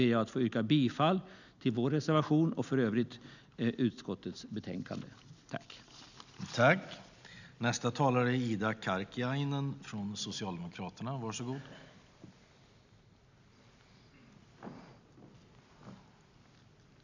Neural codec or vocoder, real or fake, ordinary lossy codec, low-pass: none; real; none; 7.2 kHz